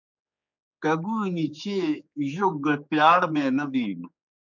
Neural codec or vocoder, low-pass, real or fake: codec, 16 kHz, 4 kbps, X-Codec, HuBERT features, trained on general audio; 7.2 kHz; fake